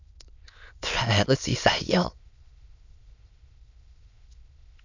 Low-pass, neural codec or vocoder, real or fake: 7.2 kHz; autoencoder, 22.05 kHz, a latent of 192 numbers a frame, VITS, trained on many speakers; fake